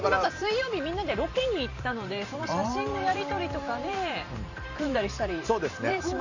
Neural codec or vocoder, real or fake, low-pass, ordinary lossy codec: vocoder, 44.1 kHz, 128 mel bands every 512 samples, BigVGAN v2; fake; 7.2 kHz; none